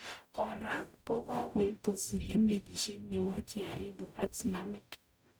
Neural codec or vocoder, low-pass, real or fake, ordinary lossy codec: codec, 44.1 kHz, 0.9 kbps, DAC; none; fake; none